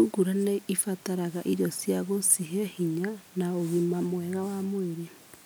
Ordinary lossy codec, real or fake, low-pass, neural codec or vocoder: none; real; none; none